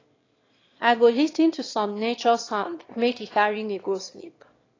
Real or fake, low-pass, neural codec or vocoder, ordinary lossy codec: fake; 7.2 kHz; autoencoder, 22.05 kHz, a latent of 192 numbers a frame, VITS, trained on one speaker; AAC, 32 kbps